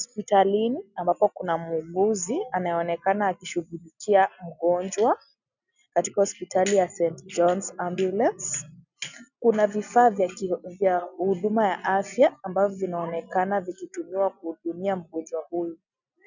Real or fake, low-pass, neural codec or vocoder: real; 7.2 kHz; none